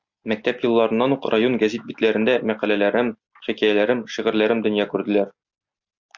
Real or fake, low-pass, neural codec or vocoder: real; 7.2 kHz; none